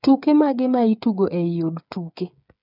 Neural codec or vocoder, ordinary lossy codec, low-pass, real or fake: codec, 16 kHz, 8 kbps, FreqCodec, smaller model; none; 5.4 kHz; fake